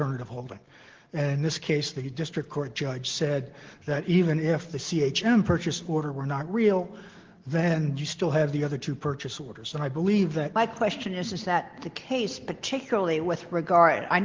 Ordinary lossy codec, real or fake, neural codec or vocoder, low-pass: Opus, 16 kbps; real; none; 7.2 kHz